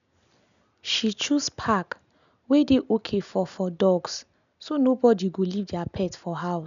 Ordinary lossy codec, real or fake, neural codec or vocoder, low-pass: none; real; none; 7.2 kHz